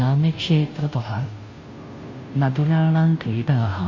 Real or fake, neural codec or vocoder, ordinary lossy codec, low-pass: fake; codec, 16 kHz, 0.5 kbps, FunCodec, trained on Chinese and English, 25 frames a second; MP3, 32 kbps; 7.2 kHz